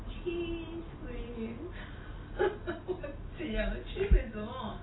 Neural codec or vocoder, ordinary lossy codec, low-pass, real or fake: none; AAC, 16 kbps; 7.2 kHz; real